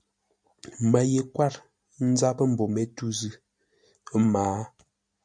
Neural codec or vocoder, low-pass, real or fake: none; 9.9 kHz; real